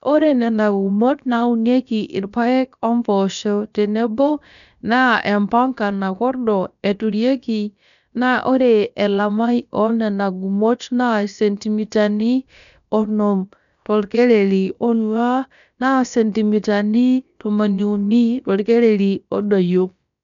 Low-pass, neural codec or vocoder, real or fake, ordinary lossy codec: 7.2 kHz; codec, 16 kHz, about 1 kbps, DyCAST, with the encoder's durations; fake; none